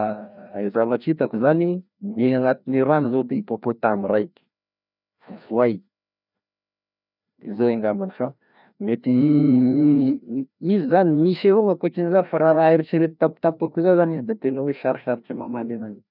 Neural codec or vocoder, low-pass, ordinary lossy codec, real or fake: codec, 16 kHz, 1 kbps, FreqCodec, larger model; 5.4 kHz; none; fake